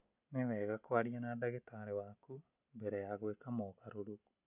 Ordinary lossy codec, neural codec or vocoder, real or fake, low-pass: none; none; real; 3.6 kHz